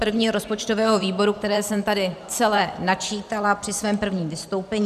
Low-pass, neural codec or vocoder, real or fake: 14.4 kHz; vocoder, 44.1 kHz, 128 mel bands every 512 samples, BigVGAN v2; fake